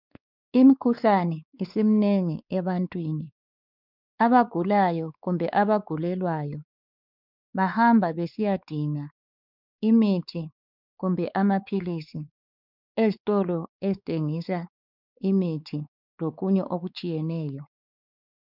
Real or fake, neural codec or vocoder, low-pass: fake; codec, 16 kHz, 4 kbps, X-Codec, WavLM features, trained on Multilingual LibriSpeech; 5.4 kHz